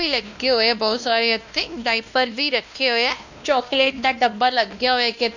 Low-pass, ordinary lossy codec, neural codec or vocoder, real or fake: 7.2 kHz; none; codec, 16 kHz, 1 kbps, X-Codec, WavLM features, trained on Multilingual LibriSpeech; fake